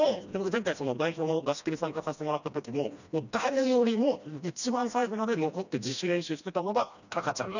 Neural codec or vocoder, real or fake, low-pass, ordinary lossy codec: codec, 16 kHz, 1 kbps, FreqCodec, smaller model; fake; 7.2 kHz; none